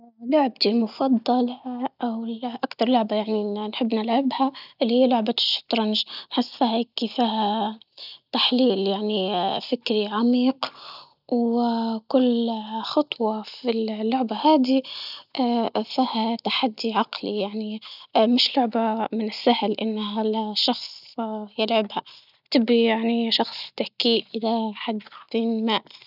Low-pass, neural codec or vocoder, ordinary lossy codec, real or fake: 5.4 kHz; none; none; real